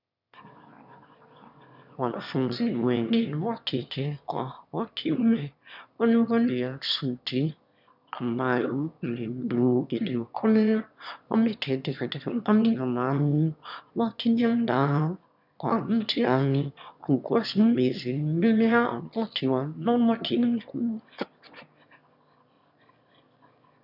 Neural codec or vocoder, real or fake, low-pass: autoencoder, 22.05 kHz, a latent of 192 numbers a frame, VITS, trained on one speaker; fake; 5.4 kHz